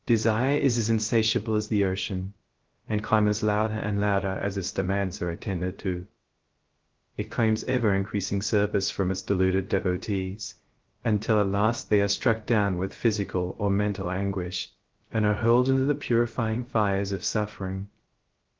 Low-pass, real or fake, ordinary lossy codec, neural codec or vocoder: 7.2 kHz; fake; Opus, 16 kbps; codec, 16 kHz, 0.3 kbps, FocalCodec